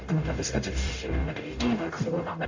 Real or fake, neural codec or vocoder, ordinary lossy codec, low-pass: fake; codec, 44.1 kHz, 0.9 kbps, DAC; AAC, 48 kbps; 7.2 kHz